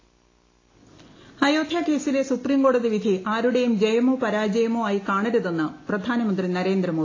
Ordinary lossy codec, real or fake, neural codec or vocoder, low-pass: AAC, 32 kbps; real; none; 7.2 kHz